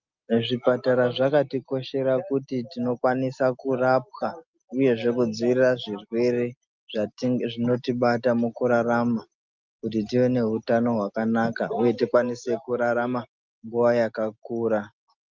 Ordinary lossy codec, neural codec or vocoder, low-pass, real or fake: Opus, 24 kbps; none; 7.2 kHz; real